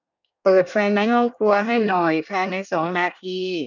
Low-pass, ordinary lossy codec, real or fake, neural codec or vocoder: 7.2 kHz; none; fake; codec, 24 kHz, 1 kbps, SNAC